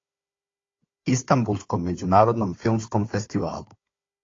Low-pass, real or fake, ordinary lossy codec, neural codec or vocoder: 7.2 kHz; fake; AAC, 32 kbps; codec, 16 kHz, 4 kbps, FunCodec, trained on Chinese and English, 50 frames a second